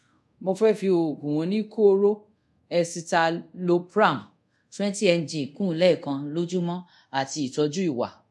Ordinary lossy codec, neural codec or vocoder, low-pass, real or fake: none; codec, 24 kHz, 0.5 kbps, DualCodec; none; fake